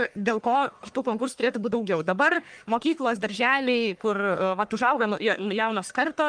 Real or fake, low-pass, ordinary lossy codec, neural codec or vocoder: fake; 9.9 kHz; Opus, 32 kbps; codec, 44.1 kHz, 1.7 kbps, Pupu-Codec